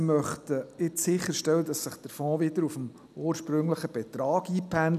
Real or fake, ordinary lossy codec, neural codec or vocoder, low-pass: real; none; none; 14.4 kHz